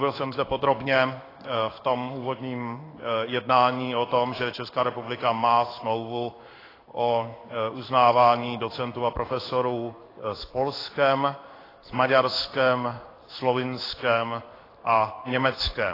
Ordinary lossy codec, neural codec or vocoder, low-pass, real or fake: AAC, 24 kbps; codec, 16 kHz in and 24 kHz out, 1 kbps, XY-Tokenizer; 5.4 kHz; fake